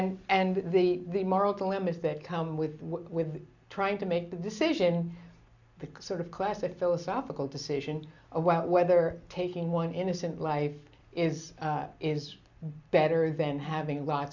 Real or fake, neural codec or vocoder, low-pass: real; none; 7.2 kHz